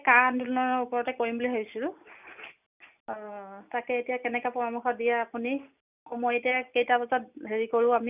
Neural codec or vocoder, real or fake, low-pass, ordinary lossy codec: none; real; 3.6 kHz; none